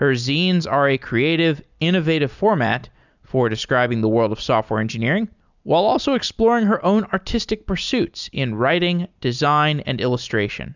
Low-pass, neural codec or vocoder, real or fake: 7.2 kHz; none; real